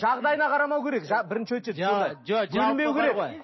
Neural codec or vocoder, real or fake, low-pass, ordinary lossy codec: none; real; 7.2 kHz; MP3, 24 kbps